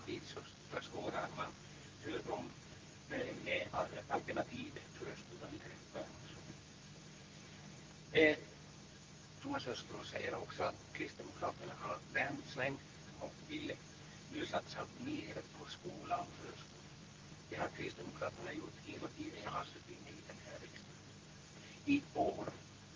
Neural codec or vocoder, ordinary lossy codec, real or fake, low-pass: codec, 24 kHz, 0.9 kbps, WavTokenizer, medium speech release version 2; Opus, 32 kbps; fake; 7.2 kHz